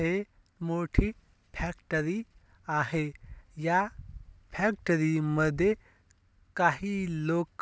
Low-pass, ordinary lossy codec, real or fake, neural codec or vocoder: none; none; real; none